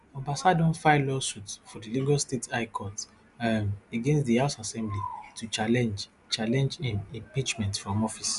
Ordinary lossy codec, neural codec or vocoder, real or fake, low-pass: none; none; real; 10.8 kHz